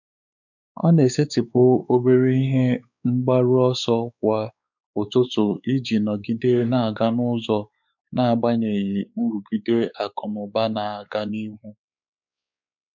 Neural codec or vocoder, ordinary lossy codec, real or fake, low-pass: codec, 16 kHz, 4 kbps, X-Codec, WavLM features, trained on Multilingual LibriSpeech; none; fake; 7.2 kHz